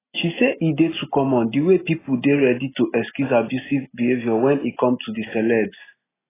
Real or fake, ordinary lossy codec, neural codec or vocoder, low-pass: real; AAC, 16 kbps; none; 3.6 kHz